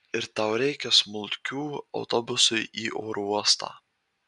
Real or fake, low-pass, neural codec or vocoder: real; 10.8 kHz; none